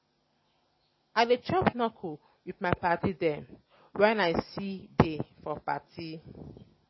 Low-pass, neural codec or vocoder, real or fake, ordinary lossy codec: 7.2 kHz; none; real; MP3, 24 kbps